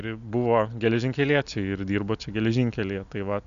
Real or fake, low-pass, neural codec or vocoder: real; 7.2 kHz; none